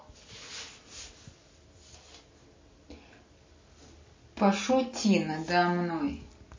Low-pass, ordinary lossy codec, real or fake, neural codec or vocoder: 7.2 kHz; MP3, 32 kbps; real; none